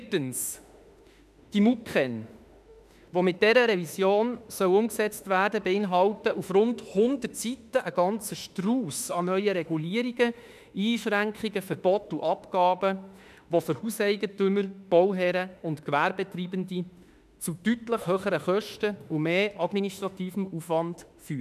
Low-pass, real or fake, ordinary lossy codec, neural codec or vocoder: 14.4 kHz; fake; none; autoencoder, 48 kHz, 32 numbers a frame, DAC-VAE, trained on Japanese speech